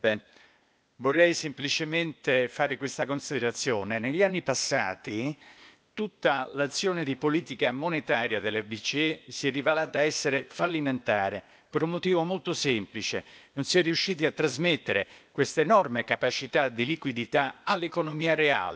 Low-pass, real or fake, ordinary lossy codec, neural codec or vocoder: none; fake; none; codec, 16 kHz, 0.8 kbps, ZipCodec